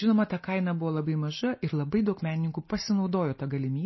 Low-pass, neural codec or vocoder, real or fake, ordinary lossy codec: 7.2 kHz; none; real; MP3, 24 kbps